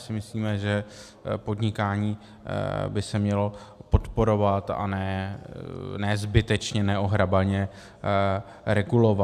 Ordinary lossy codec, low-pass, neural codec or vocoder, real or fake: AAC, 96 kbps; 14.4 kHz; none; real